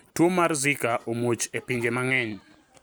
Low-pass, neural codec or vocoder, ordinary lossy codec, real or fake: none; none; none; real